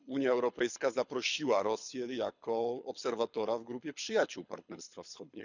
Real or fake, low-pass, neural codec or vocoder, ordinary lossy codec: fake; 7.2 kHz; codec, 24 kHz, 6 kbps, HILCodec; none